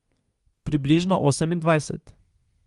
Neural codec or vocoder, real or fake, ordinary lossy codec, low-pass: codec, 24 kHz, 1 kbps, SNAC; fake; Opus, 32 kbps; 10.8 kHz